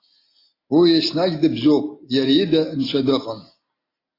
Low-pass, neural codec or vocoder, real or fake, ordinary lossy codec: 5.4 kHz; none; real; AAC, 24 kbps